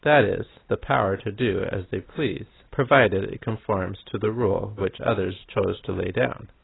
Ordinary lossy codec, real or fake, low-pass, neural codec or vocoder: AAC, 16 kbps; real; 7.2 kHz; none